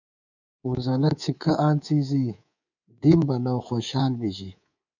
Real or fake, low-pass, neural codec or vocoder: fake; 7.2 kHz; codec, 24 kHz, 3.1 kbps, DualCodec